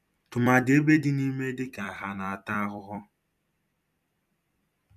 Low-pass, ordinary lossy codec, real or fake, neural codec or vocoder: 14.4 kHz; none; fake; vocoder, 44.1 kHz, 128 mel bands every 256 samples, BigVGAN v2